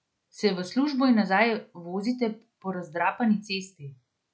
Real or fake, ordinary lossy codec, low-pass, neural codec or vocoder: real; none; none; none